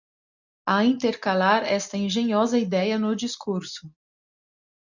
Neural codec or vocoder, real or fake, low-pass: none; real; 7.2 kHz